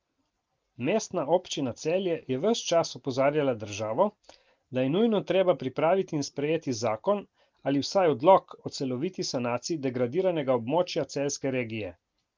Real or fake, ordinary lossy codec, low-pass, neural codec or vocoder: real; Opus, 32 kbps; 7.2 kHz; none